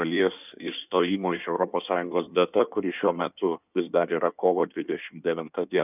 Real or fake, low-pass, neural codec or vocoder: fake; 3.6 kHz; codec, 16 kHz in and 24 kHz out, 1.1 kbps, FireRedTTS-2 codec